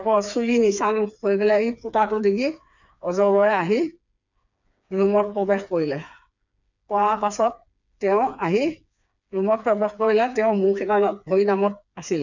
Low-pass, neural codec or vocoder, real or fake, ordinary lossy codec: 7.2 kHz; codec, 16 kHz, 4 kbps, FreqCodec, smaller model; fake; none